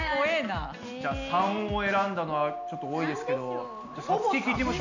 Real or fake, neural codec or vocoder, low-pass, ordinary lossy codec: real; none; 7.2 kHz; none